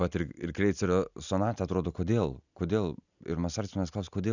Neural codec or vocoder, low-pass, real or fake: none; 7.2 kHz; real